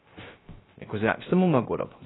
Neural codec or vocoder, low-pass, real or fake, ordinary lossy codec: codec, 16 kHz, 0.3 kbps, FocalCodec; 7.2 kHz; fake; AAC, 16 kbps